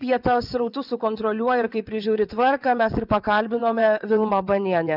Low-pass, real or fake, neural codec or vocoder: 5.4 kHz; fake; vocoder, 22.05 kHz, 80 mel bands, WaveNeXt